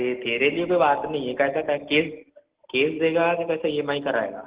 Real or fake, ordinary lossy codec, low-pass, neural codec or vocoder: real; Opus, 16 kbps; 3.6 kHz; none